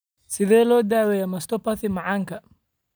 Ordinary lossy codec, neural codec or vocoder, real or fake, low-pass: none; none; real; none